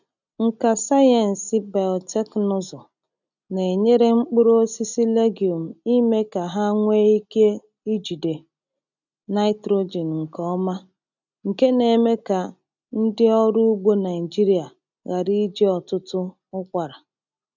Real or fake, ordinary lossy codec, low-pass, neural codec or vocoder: real; none; 7.2 kHz; none